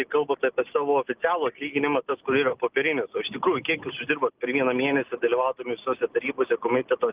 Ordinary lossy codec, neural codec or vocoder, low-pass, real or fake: Opus, 32 kbps; none; 3.6 kHz; real